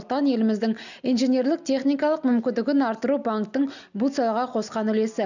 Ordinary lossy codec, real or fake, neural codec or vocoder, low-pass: none; real; none; 7.2 kHz